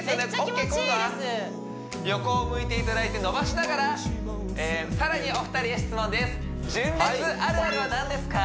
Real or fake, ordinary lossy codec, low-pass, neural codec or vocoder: real; none; none; none